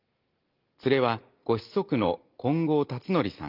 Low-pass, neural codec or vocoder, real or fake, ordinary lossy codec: 5.4 kHz; none; real; Opus, 32 kbps